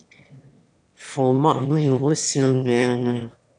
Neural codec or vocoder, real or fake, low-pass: autoencoder, 22.05 kHz, a latent of 192 numbers a frame, VITS, trained on one speaker; fake; 9.9 kHz